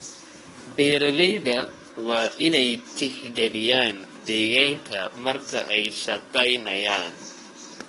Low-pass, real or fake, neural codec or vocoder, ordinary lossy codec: 10.8 kHz; fake; codec, 24 kHz, 1 kbps, SNAC; AAC, 32 kbps